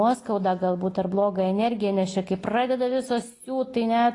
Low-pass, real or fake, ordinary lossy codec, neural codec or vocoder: 10.8 kHz; real; AAC, 32 kbps; none